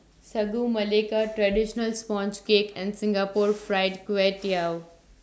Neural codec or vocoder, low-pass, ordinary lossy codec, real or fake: none; none; none; real